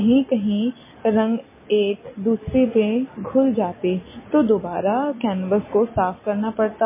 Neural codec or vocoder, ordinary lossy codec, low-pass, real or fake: none; MP3, 16 kbps; 3.6 kHz; real